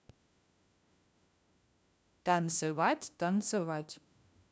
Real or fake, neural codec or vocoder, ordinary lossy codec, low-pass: fake; codec, 16 kHz, 1 kbps, FunCodec, trained on LibriTTS, 50 frames a second; none; none